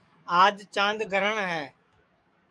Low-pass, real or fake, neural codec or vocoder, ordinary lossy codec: 9.9 kHz; fake; codec, 44.1 kHz, 7.8 kbps, DAC; MP3, 96 kbps